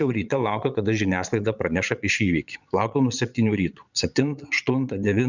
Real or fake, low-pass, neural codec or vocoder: fake; 7.2 kHz; vocoder, 22.05 kHz, 80 mel bands, Vocos